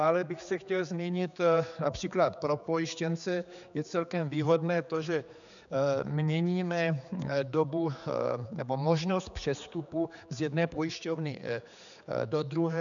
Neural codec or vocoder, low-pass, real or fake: codec, 16 kHz, 4 kbps, X-Codec, HuBERT features, trained on general audio; 7.2 kHz; fake